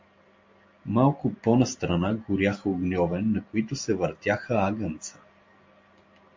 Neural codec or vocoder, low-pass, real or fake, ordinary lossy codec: none; 7.2 kHz; real; MP3, 48 kbps